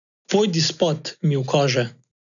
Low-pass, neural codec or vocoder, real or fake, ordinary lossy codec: 7.2 kHz; none; real; none